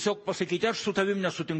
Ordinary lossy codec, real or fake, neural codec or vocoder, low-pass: MP3, 32 kbps; real; none; 10.8 kHz